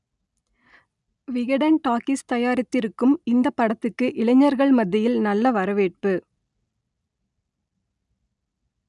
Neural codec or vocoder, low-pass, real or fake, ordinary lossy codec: none; 10.8 kHz; real; none